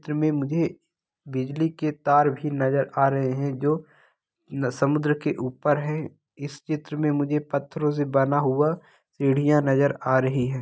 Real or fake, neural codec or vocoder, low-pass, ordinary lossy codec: real; none; none; none